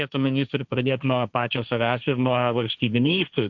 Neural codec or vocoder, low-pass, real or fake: codec, 16 kHz, 1.1 kbps, Voila-Tokenizer; 7.2 kHz; fake